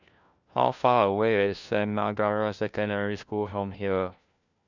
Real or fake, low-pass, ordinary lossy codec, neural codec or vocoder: fake; 7.2 kHz; none; codec, 16 kHz, 1 kbps, FunCodec, trained on LibriTTS, 50 frames a second